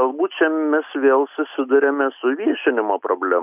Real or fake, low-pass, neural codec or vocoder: real; 3.6 kHz; none